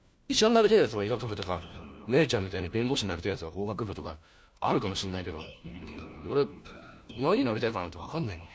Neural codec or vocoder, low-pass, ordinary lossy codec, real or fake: codec, 16 kHz, 1 kbps, FunCodec, trained on LibriTTS, 50 frames a second; none; none; fake